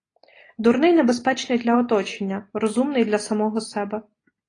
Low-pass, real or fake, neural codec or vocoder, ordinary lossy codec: 9.9 kHz; real; none; AAC, 32 kbps